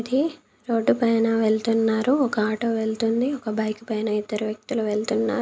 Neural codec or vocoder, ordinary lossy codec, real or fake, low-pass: none; none; real; none